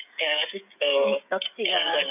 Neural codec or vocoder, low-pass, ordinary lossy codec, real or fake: codec, 16 kHz, 16 kbps, FreqCodec, smaller model; 3.6 kHz; none; fake